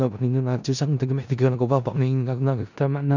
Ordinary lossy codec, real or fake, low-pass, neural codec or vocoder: none; fake; 7.2 kHz; codec, 16 kHz in and 24 kHz out, 0.4 kbps, LongCat-Audio-Codec, four codebook decoder